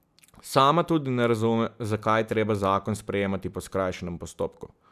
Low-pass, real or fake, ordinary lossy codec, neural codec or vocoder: 14.4 kHz; real; none; none